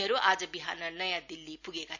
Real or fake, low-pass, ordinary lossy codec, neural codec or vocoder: real; 7.2 kHz; none; none